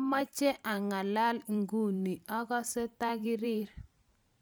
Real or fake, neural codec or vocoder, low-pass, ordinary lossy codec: fake; vocoder, 44.1 kHz, 128 mel bands every 512 samples, BigVGAN v2; none; none